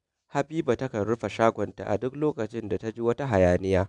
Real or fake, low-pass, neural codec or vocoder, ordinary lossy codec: real; 10.8 kHz; none; none